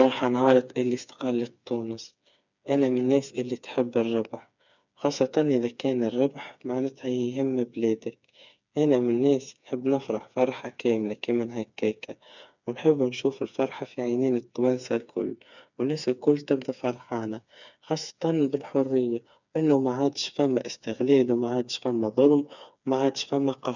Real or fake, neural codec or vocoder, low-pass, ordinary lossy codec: fake; codec, 16 kHz, 4 kbps, FreqCodec, smaller model; 7.2 kHz; none